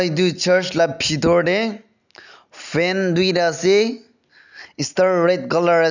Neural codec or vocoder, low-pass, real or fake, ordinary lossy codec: none; 7.2 kHz; real; none